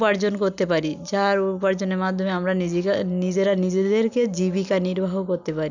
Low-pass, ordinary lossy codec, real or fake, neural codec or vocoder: 7.2 kHz; none; real; none